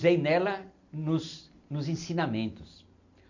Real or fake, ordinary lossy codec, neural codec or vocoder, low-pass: real; none; none; 7.2 kHz